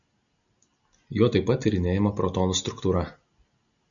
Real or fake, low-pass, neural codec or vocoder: real; 7.2 kHz; none